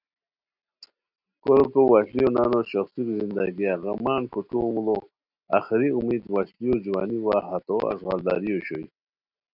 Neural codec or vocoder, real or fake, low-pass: none; real; 5.4 kHz